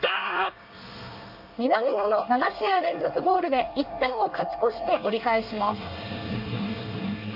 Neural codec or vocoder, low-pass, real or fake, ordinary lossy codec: codec, 24 kHz, 1 kbps, SNAC; 5.4 kHz; fake; none